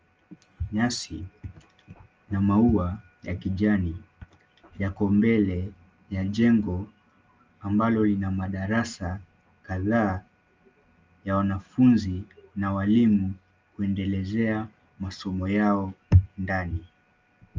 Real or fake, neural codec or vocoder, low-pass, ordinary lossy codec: real; none; 7.2 kHz; Opus, 24 kbps